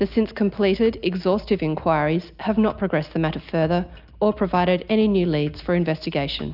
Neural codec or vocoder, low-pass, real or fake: none; 5.4 kHz; real